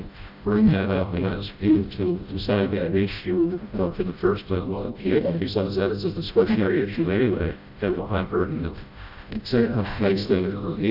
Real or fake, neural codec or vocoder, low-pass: fake; codec, 16 kHz, 0.5 kbps, FreqCodec, smaller model; 5.4 kHz